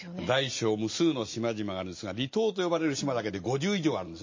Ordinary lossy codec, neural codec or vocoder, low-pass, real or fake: MP3, 32 kbps; none; 7.2 kHz; real